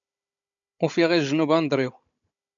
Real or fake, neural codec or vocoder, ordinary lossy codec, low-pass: fake; codec, 16 kHz, 16 kbps, FunCodec, trained on Chinese and English, 50 frames a second; MP3, 64 kbps; 7.2 kHz